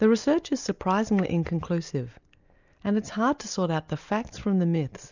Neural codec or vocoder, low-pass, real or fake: none; 7.2 kHz; real